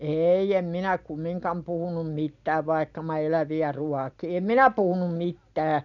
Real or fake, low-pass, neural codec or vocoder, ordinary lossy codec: real; 7.2 kHz; none; none